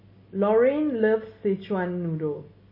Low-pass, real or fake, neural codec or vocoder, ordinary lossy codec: 5.4 kHz; real; none; MP3, 24 kbps